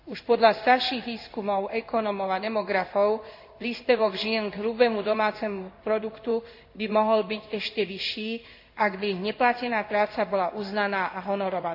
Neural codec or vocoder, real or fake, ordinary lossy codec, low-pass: codec, 16 kHz in and 24 kHz out, 1 kbps, XY-Tokenizer; fake; AAC, 48 kbps; 5.4 kHz